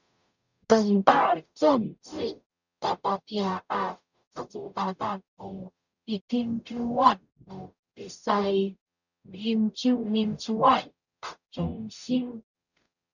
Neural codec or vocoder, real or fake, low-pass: codec, 44.1 kHz, 0.9 kbps, DAC; fake; 7.2 kHz